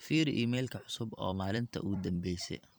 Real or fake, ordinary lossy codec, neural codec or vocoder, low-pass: fake; none; vocoder, 44.1 kHz, 128 mel bands every 256 samples, BigVGAN v2; none